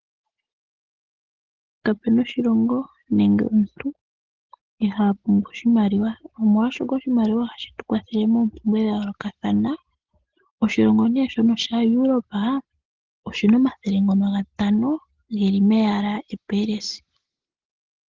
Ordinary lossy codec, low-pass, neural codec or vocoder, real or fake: Opus, 16 kbps; 7.2 kHz; none; real